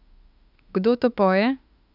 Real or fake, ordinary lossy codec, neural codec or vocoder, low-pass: fake; none; autoencoder, 48 kHz, 32 numbers a frame, DAC-VAE, trained on Japanese speech; 5.4 kHz